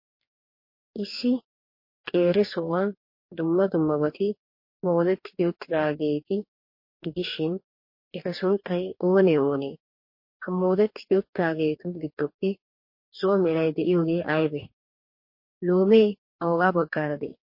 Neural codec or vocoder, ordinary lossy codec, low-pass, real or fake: codec, 44.1 kHz, 2.6 kbps, DAC; MP3, 32 kbps; 5.4 kHz; fake